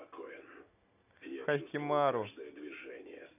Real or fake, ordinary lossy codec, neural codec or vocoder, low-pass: real; none; none; 3.6 kHz